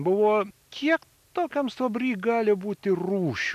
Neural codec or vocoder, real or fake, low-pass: none; real; 14.4 kHz